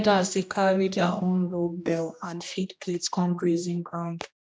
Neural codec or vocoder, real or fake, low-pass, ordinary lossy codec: codec, 16 kHz, 1 kbps, X-Codec, HuBERT features, trained on general audio; fake; none; none